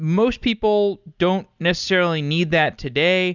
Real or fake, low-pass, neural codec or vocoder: real; 7.2 kHz; none